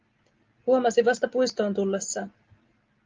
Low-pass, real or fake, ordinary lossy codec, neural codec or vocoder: 7.2 kHz; real; Opus, 32 kbps; none